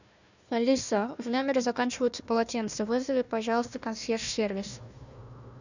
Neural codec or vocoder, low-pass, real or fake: codec, 16 kHz, 1 kbps, FunCodec, trained on Chinese and English, 50 frames a second; 7.2 kHz; fake